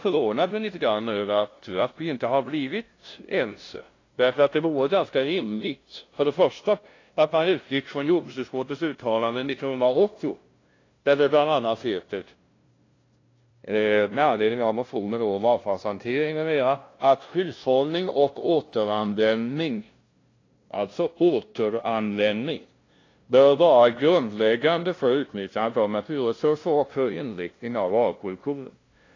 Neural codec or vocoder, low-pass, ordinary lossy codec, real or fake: codec, 16 kHz, 0.5 kbps, FunCodec, trained on LibriTTS, 25 frames a second; 7.2 kHz; AAC, 32 kbps; fake